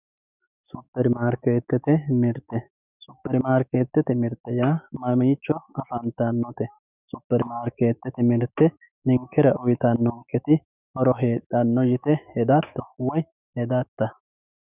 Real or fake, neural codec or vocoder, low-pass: fake; autoencoder, 48 kHz, 128 numbers a frame, DAC-VAE, trained on Japanese speech; 3.6 kHz